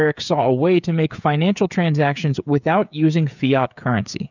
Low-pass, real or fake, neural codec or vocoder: 7.2 kHz; fake; codec, 16 kHz, 8 kbps, FreqCodec, smaller model